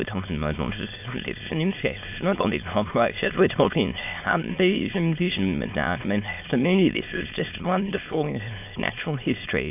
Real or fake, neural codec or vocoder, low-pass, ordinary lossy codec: fake; autoencoder, 22.05 kHz, a latent of 192 numbers a frame, VITS, trained on many speakers; 3.6 kHz; AAC, 32 kbps